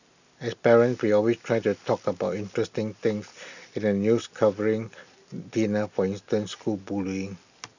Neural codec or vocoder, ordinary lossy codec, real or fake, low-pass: none; none; real; 7.2 kHz